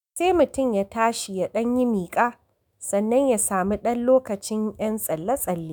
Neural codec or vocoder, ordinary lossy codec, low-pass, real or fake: autoencoder, 48 kHz, 128 numbers a frame, DAC-VAE, trained on Japanese speech; none; none; fake